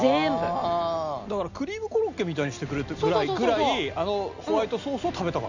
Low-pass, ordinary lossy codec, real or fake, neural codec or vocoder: 7.2 kHz; none; real; none